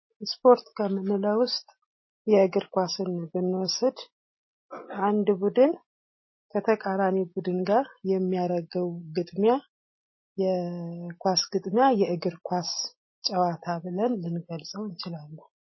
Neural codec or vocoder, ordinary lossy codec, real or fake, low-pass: none; MP3, 24 kbps; real; 7.2 kHz